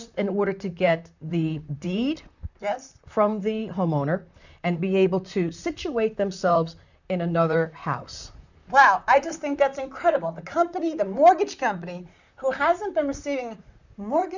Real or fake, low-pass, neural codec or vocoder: fake; 7.2 kHz; vocoder, 44.1 kHz, 128 mel bands, Pupu-Vocoder